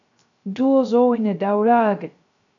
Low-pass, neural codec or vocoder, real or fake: 7.2 kHz; codec, 16 kHz, 0.3 kbps, FocalCodec; fake